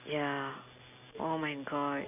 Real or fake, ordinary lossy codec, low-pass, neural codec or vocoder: real; none; 3.6 kHz; none